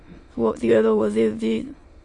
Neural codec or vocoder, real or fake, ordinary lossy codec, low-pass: autoencoder, 22.05 kHz, a latent of 192 numbers a frame, VITS, trained on many speakers; fake; MP3, 48 kbps; 9.9 kHz